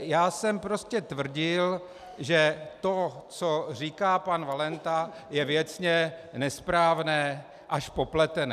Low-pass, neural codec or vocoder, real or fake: 14.4 kHz; none; real